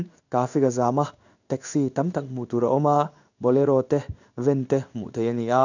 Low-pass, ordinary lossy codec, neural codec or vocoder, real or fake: 7.2 kHz; none; codec, 16 kHz in and 24 kHz out, 1 kbps, XY-Tokenizer; fake